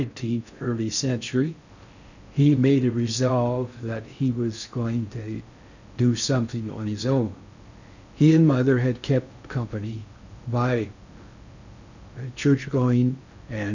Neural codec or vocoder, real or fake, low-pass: codec, 16 kHz in and 24 kHz out, 0.6 kbps, FocalCodec, streaming, 4096 codes; fake; 7.2 kHz